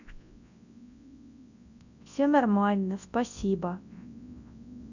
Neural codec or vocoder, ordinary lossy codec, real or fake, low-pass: codec, 24 kHz, 0.9 kbps, WavTokenizer, large speech release; none; fake; 7.2 kHz